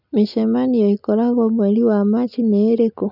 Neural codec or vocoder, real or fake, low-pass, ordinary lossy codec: none; real; 5.4 kHz; none